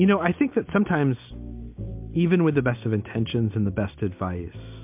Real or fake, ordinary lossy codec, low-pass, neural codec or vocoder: real; MP3, 32 kbps; 3.6 kHz; none